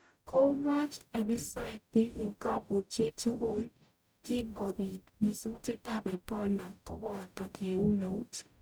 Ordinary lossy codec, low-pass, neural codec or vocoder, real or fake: none; none; codec, 44.1 kHz, 0.9 kbps, DAC; fake